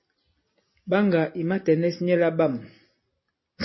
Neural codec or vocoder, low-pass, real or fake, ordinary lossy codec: none; 7.2 kHz; real; MP3, 24 kbps